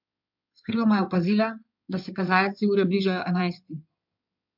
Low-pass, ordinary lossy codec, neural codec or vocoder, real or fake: 5.4 kHz; MP3, 48 kbps; codec, 16 kHz in and 24 kHz out, 2.2 kbps, FireRedTTS-2 codec; fake